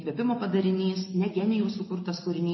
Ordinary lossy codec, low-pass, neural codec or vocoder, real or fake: MP3, 24 kbps; 7.2 kHz; none; real